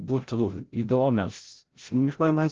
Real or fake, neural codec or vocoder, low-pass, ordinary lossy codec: fake; codec, 16 kHz, 0.5 kbps, FreqCodec, larger model; 7.2 kHz; Opus, 16 kbps